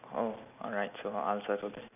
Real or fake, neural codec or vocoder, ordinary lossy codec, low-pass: real; none; none; 3.6 kHz